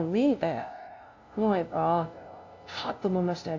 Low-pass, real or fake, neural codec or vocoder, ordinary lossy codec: 7.2 kHz; fake; codec, 16 kHz, 0.5 kbps, FunCodec, trained on LibriTTS, 25 frames a second; AAC, 48 kbps